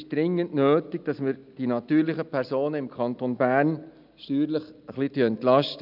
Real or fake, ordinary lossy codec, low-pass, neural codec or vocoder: real; none; 5.4 kHz; none